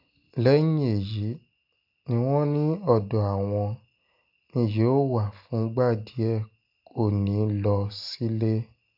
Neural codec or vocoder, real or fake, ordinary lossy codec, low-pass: none; real; none; 5.4 kHz